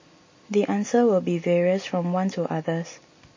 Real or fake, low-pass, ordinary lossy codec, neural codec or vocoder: real; 7.2 kHz; MP3, 32 kbps; none